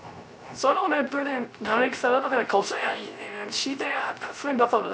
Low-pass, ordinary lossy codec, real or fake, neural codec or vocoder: none; none; fake; codec, 16 kHz, 0.3 kbps, FocalCodec